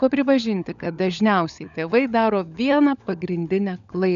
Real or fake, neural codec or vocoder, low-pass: fake; codec, 16 kHz, 16 kbps, FunCodec, trained on LibriTTS, 50 frames a second; 7.2 kHz